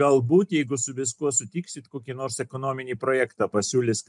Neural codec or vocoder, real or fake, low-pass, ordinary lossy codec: none; real; 10.8 kHz; MP3, 96 kbps